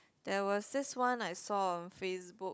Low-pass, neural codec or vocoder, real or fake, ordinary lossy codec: none; codec, 16 kHz, 16 kbps, FunCodec, trained on Chinese and English, 50 frames a second; fake; none